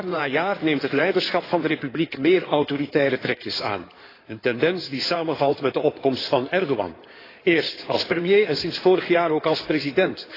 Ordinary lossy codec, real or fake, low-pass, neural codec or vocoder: AAC, 24 kbps; fake; 5.4 kHz; codec, 16 kHz in and 24 kHz out, 2.2 kbps, FireRedTTS-2 codec